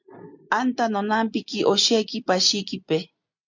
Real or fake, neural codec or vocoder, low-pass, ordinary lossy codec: real; none; 7.2 kHz; MP3, 64 kbps